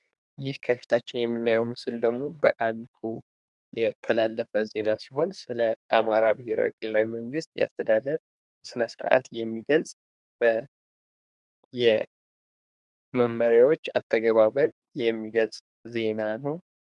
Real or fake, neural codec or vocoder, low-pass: fake; codec, 24 kHz, 1 kbps, SNAC; 10.8 kHz